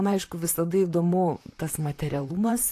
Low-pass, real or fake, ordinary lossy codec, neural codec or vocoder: 14.4 kHz; fake; AAC, 64 kbps; vocoder, 44.1 kHz, 128 mel bands, Pupu-Vocoder